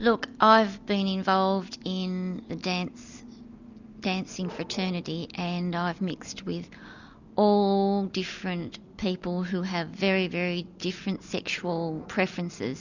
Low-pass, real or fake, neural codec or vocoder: 7.2 kHz; real; none